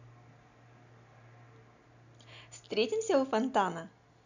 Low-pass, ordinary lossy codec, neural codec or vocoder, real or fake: 7.2 kHz; none; none; real